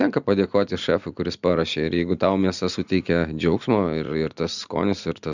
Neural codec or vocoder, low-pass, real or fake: none; 7.2 kHz; real